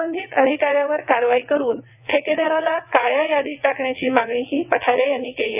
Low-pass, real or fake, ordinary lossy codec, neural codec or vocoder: 3.6 kHz; fake; none; vocoder, 22.05 kHz, 80 mel bands, WaveNeXt